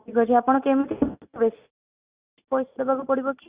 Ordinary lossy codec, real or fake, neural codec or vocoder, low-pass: Opus, 64 kbps; real; none; 3.6 kHz